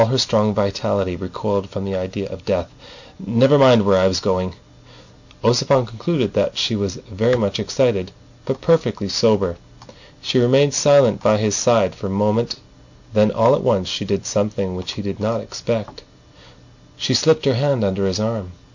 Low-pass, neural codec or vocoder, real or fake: 7.2 kHz; none; real